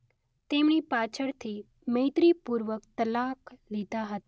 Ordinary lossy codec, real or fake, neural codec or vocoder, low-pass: none; real; none; none